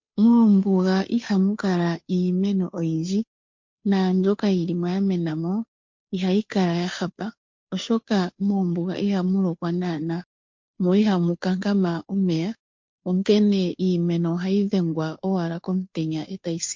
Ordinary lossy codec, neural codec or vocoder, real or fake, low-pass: MP3, 48 kbps; codec, 16 kHz, 2 kbps, FunCodec, trained on Chinese and English, 25 frames a second; fake; 7.2 kHz